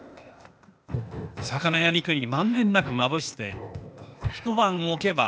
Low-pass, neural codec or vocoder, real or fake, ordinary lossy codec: none; codec, 16 kHz, 0.8 kbps, ZipCodec; fake; none